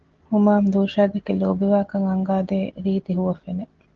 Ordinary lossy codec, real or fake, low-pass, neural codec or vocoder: Opus, 16 kbps; real; 7.2 kHz; none